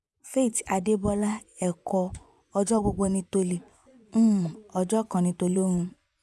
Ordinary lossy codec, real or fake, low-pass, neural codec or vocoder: none; fake; none; vocoder, 24 kHz, 100 mel bands, Vocos